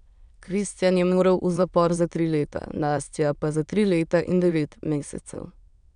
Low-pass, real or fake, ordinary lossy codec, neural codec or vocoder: 9.9 kHz; fake; none; autoencoder, 22.05 kHz, a latent of 192 numbers a frame, VITS, trained on many speakers